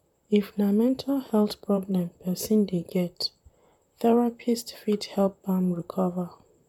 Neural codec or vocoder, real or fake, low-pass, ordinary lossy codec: vocoder, 44.1 kHz, 128 mel bands, Pupu-Vocoder; fake; 19.8 kHz; none